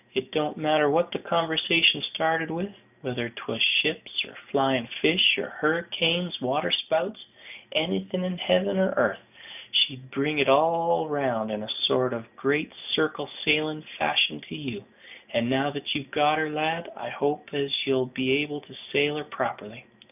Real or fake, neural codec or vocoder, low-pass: real; none; 3.6 kHz